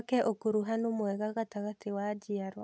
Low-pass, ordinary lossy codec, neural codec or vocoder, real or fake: none; none; none; real